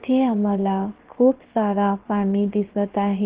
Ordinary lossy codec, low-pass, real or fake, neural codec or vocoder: Opus, 16 kbps; 3.6 kHz; fake; codec, 16 kHz, 0.7 kbps, FocalCodec